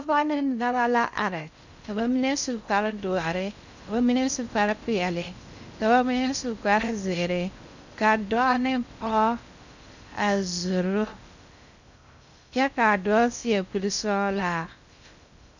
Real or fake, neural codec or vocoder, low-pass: fake; codec, 16 kHz in and 24 kHz out, 0.6 kbps, FocalCodec, streaming, 2048 codes; 7.2 kHz